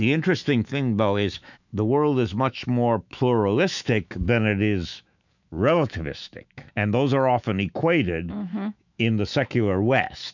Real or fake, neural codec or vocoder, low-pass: fake; autoencoder, 48 kHz, 128 numbers a frame, DAC-VAE, trained on Japanese speech; 7.2 kHz